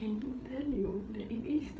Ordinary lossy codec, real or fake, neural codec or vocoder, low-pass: none; fake; codec, 16 kHz, 8 kbps, FreqCodec, larger model; none